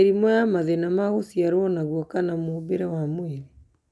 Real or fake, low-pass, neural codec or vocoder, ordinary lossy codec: real; none; none; none